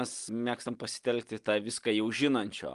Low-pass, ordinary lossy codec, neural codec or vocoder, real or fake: 10.8 kHz; Opus, 32 kbps; none; real